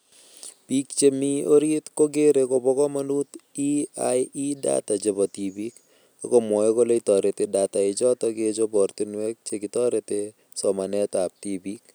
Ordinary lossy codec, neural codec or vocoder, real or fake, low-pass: none; none; real; none